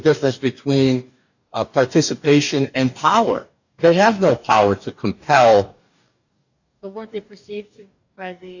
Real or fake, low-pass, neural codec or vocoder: fake; 7.2 kHz; codec, 44.1 kHz, 2.6 kbps, DAC